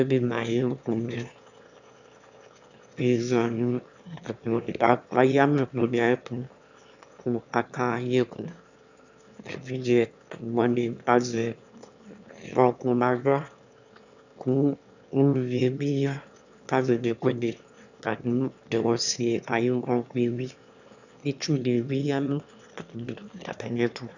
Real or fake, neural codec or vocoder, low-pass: fake; autoencoder, 22.05 kHz, a latent of 192 numbers a frame, VITS, trained on one speaker; 7.2 kHz